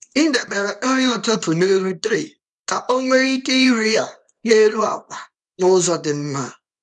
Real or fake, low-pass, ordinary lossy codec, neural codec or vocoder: fake; 10.8 kHz; none; codec, 24 kHz, 0.9 kbps, WavTokenizer, small release